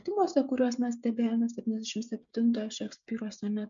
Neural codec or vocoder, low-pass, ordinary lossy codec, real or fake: codec, 16 kHz, 16 kbps, FreqCodec, smaller model; 7.2 kHz; MP3, 64 kbps; fake